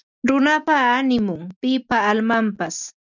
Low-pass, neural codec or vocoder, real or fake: 7.2 kHz; none; real